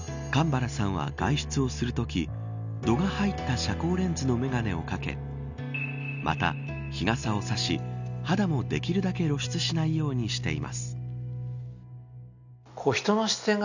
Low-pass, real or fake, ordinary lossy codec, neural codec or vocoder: 7.2 kHz; real; none; none